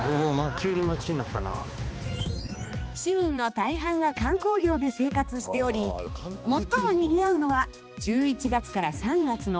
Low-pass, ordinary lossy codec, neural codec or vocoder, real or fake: none; none; codec, 16 kHz, 2 kbps, X-Codec, HuBERT features, trained on balanced general audio; fake